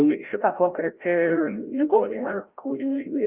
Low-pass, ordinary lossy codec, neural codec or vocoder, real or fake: 3.6 kHz; Opus, 32 kbps; codec, 16 kHz, 0.5 kbps, FreqCodec, larger model; fake